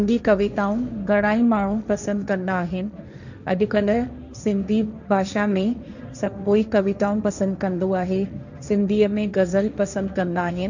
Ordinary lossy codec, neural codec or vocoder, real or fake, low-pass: none; codec, 16 kHz, 1.1 kbps, Voila-Tokenizer; fake; 7.2 kHz